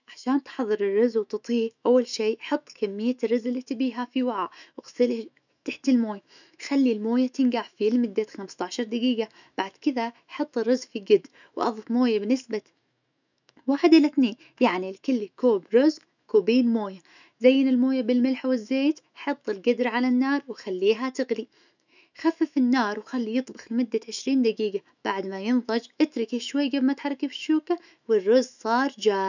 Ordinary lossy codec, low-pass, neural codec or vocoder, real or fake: none; 7.2 kHz; autoencoder, 48 kHz, 128 numbers a frame, DAC-VAE, trained on Japanese speech; fake